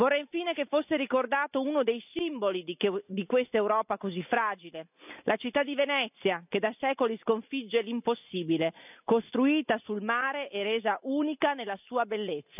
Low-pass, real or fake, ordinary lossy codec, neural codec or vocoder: 3.6 kHz; real; none; none